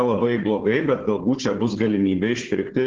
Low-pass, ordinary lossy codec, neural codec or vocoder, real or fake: 7.2 kHz; Opus, 16 kbps; codec, 16 kHz, 4 kbps, FunCodec, trained on Chinese and English, 50 frames a second; fake